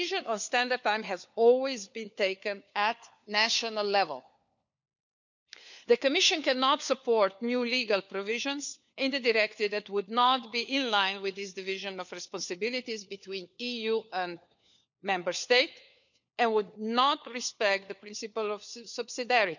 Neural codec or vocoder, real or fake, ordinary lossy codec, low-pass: codec, 16 kHz, 4 kbps, FunCodec, trained on LibriTTS, 50 frames a second; fake; none; 7.2 kHz